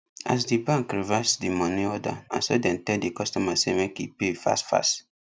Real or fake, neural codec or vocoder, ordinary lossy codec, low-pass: real; none; none; none